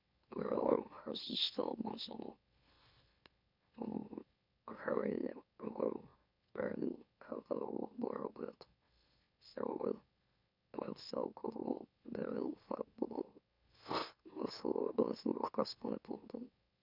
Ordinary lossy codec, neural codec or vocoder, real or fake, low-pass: none; autoencoder, 44.1 kHz, a latent of 192 numbers a frame, MeloTTS; fake; 5.4 kHz